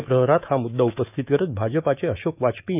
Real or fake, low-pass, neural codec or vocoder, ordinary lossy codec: fake; 3.6 kHz; autoencoder, 48 kHz, 128 numbers a frame, DAC-VAE, trained on Japanese speech; none